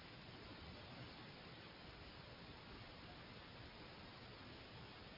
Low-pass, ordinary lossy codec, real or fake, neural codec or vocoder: 5.4 kHz; MP3, 24 kbps; real; none